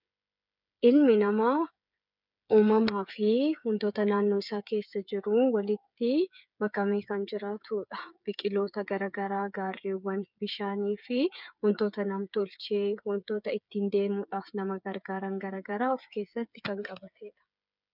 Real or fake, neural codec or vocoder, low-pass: fake; codec, 16 kHz, 8 kbps, FreqCodec, smaller model; 5.4 kHz